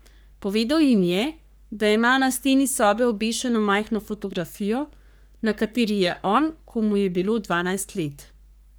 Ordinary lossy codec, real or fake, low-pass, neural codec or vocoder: none; fake; none; codec, 44.1 kHz, 3.4 kbps, Pupu-Codec